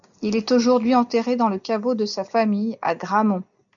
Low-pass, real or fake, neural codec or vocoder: 7.2 kHz; real; none